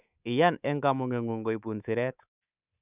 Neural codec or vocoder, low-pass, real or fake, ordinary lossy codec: codec, 24 kHz, 3.1 kbps, DualCodec; 3.6 kHz; fake; none